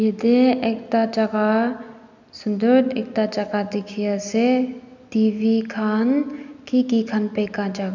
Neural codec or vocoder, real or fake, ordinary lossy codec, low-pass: none; real; none; 7.2 kHz